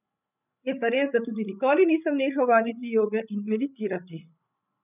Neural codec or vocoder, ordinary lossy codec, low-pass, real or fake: codec, 16 kHz, 16 kbps, FreqCodec, larger model; none; 3.6 kHz; fake